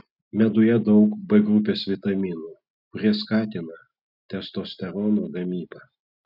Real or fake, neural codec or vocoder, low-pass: real; none; 5.4 kHz